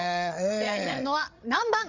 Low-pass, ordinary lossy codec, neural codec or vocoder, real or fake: 7.2 kHz; none; codec, 16 kHz, 8 kbps, FreqCodec, larger model; fake